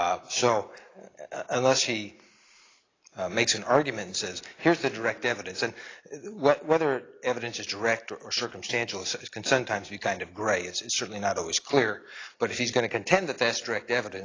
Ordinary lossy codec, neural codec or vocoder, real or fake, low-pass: AAC, 32 kbps; none; real; 7.2 kHz